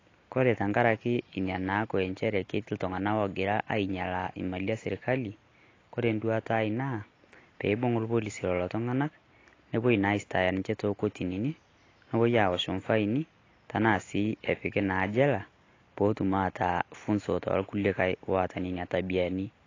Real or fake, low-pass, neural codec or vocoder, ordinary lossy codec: real; 7.2 kHz; none; AAC, 32 kbps